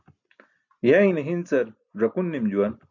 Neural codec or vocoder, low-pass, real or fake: none; 7.2 kHz; real